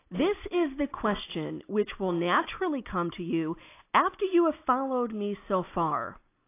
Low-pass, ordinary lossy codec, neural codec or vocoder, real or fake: 3.6 kHz; AAC, 24 kbps; none; real